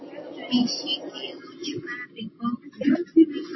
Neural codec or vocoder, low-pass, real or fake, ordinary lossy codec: none; 7.2 kHz; real; MP3, 24 kbps